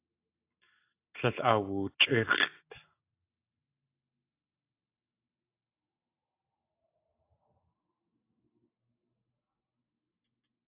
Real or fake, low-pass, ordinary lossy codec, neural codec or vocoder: real; 3.6 kHz; AAC, 24 kbps; none